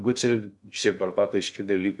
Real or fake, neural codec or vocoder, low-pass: fake; codec, 16 kHz in and 24 kHz out, 0.6 kbps, FocalCodec, streaming, 2048 codes; 10.8 kHz